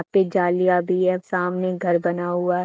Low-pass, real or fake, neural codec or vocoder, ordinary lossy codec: none; real; none; none